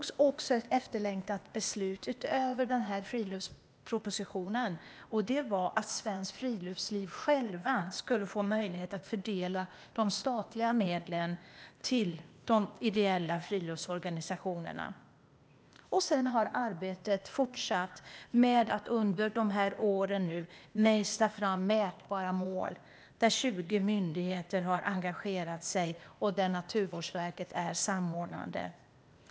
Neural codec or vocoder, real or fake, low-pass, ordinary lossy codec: codec, 16 kHz, 0.8 kbps, ZipCodec; fake; none; none